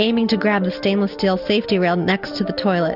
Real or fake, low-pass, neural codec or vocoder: real; 5.4 kHz; none